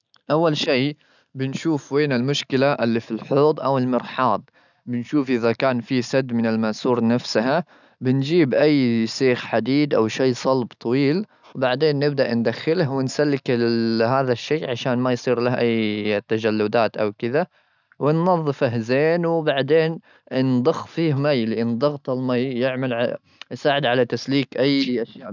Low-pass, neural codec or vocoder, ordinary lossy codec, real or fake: 7.2 kHz; none; none; real